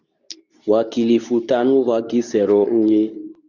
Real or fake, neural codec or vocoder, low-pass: fake; codec, 24 kHz, 0.9 kbps, WavTokenizer, medium speech release version 2; 7.2 kHz